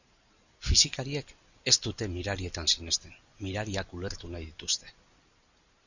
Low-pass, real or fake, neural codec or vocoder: 7.2 kHz; real; none